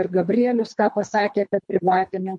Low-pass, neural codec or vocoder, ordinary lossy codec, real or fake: 10.8 kHz; codec, 24 kHz, 3 kbps, HILCodec; MP3, 48 kbps; fake